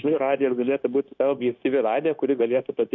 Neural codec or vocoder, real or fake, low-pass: codec, 16 kHz, 0.9 kbps, LongCat-Audio-Codec; fake; 7.2 kHz